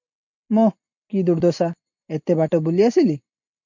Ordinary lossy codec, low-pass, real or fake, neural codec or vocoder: MP3, 48 kbps; 7.2 kHz; real; none